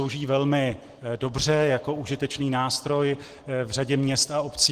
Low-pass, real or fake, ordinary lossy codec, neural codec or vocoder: 14.4 kHz; real; Opus, 16 kbps; none